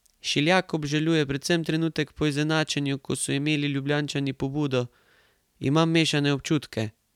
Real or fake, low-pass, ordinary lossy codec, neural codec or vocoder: fake; 19.8 kHz; none; vocoder, 44.1 kHz, 128 mel bands every 256 samples, BigVGAN v2